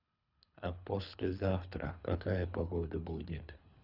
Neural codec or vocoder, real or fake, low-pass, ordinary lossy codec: codec, 24 kHz, 3 kbps, HILCodec; fake; 5.4 kHz; none